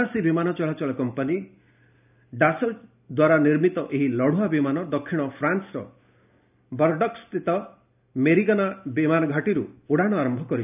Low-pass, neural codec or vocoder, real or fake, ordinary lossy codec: 3.6 kHz; none; real; none